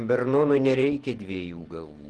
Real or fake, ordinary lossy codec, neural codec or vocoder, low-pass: fake; Opus, 16 kbps; vocoder, 48 kHz, 128 mel bands, Vocos; 10.8 kHz